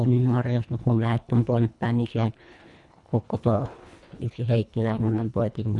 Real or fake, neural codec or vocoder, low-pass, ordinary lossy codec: fake; codec, 24 kHz, 1.5 kbps, HILCodec; 10.8 kHz; none